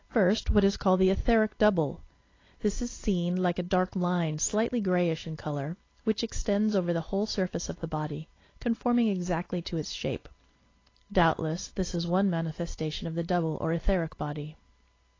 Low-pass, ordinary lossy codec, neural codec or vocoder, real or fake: 7.2 kHz; AAC, 32 kbps; none; real